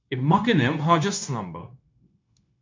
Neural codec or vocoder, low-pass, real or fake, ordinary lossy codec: codec, 16 kHz, 0.9 kbps, LongCat-Audio-Codec; 7.2 kHz; fake; MP3, 64 kbps